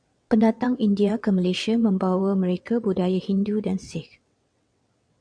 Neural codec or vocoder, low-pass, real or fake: vocoder, 22.05 kHz, 80 mel bands, WaveNeXt; 9.9 kHz; fake